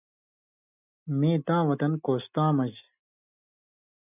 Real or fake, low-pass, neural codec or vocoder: real; 3.6 kHz; none